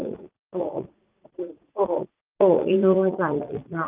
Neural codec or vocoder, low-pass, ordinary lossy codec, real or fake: vocoder, 44.1 kHz, 80 mel bands, Vocos; 3.6 kHz; Opus, 24 kbps; fake